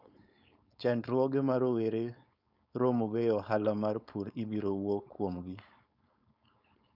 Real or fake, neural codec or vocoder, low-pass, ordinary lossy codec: fake; codec, 16 kHz, 4.8 kbps, FACodec; 5.4 kHz; none